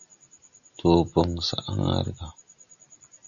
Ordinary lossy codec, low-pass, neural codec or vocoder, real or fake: Opus, 64 kbps; 7.2 kHz; none; real